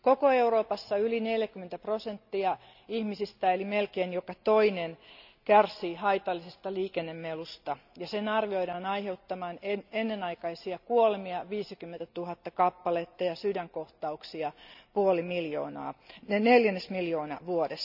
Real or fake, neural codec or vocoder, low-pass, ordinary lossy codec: real; none; 5.4 kHz; none